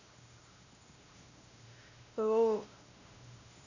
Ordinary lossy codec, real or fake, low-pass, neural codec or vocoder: none; fake; 7.2 kHz; codec, 16 kHz, 1 kbps, X-Codec, WavLM features, trained on Multilingual LibriSpeech